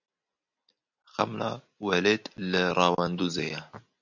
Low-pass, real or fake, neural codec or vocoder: 7.2 kHz; real; none